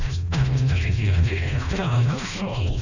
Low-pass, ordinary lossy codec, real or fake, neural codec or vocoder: 7.2 kHz; none; fake; codec, 16 kHz, 1 kbps, FreqCodec, smaller model